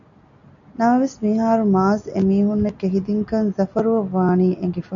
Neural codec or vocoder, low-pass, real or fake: none; 7.2 kHz; real